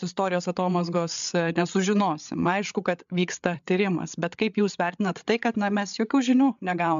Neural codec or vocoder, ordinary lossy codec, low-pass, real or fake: codec, 16 kHz, 8 kbps, FreqCodec, larger model; MP3, 64 kbps; 7.2 kHz; fake